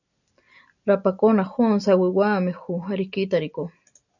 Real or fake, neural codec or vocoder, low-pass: real; none; 7.2 kHz